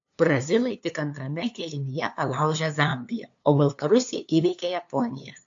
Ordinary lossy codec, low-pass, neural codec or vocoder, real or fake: AAC, 64 kbps; 7.2 kHz; codec, 16 kHz, 2 kbps, FunCodec, trained on LibriTTS, 25 frames a second; fake